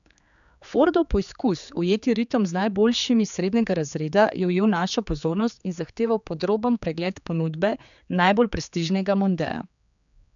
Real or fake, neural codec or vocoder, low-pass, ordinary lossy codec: fake; codec, 16 kHz, 4 kbps, X-Codec, HuBERT features, trained on general audio; 7.2 kHz; none